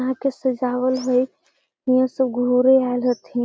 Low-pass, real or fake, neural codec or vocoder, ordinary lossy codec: none; real; none; none